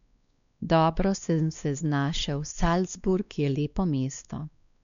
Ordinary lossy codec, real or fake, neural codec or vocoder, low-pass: none; fake; codec, 16 kHz, 2 kbps, X-Codec, WavLM features, trained on Multilingual LibriSpeech; 7.2 kHz